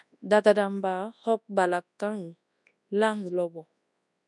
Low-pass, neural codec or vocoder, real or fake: 10.8 kHz; codec, 24 kHz, 0.9 kbps, WavTokenizer, large speech release; fake